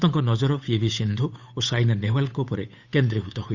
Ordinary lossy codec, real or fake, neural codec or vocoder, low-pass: Opus, 64 kbps; fake; codec, 16 kHz, 8 kbps, FunCodec, trained on Chinese and English, 25 frames a second; 7.2 kHz